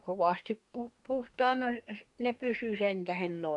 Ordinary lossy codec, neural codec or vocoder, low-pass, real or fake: none; codec, 24 kHz, 1 kbps, SNAC; 10.8 kHz; fake